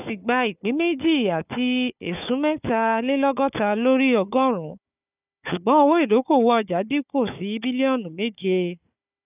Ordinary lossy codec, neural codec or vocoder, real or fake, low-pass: none; codec, 16 kHz, 4 kbps, FunCodec, trained on Chinese and English, 50 frames a second; fake; 3.6 kHz